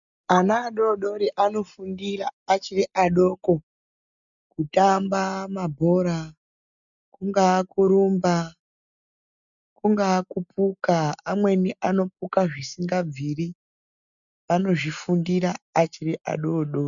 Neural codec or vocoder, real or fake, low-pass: none; real; 7.2 kHz